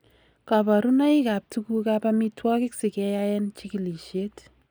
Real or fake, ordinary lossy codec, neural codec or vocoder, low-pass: real; none; none; none